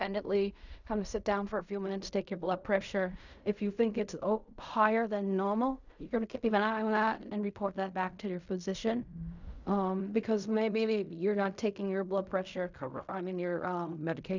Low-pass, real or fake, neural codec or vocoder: 7.2 kHz; fake; codec, 16 kHz in and 24 kHz out, 0.4 kbps, LongCat-Audio-Codec, fine tuned four codebook decoder